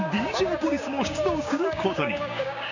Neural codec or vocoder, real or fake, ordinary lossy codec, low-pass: codec, 16 kHz, 6 kbps, DAC; fake; none; 7.2 kHz